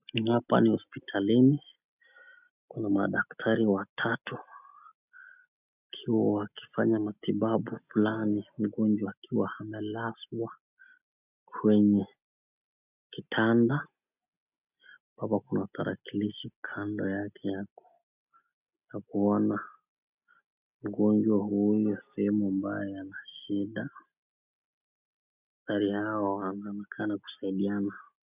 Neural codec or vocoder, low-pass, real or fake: none; 3.6 kHz; real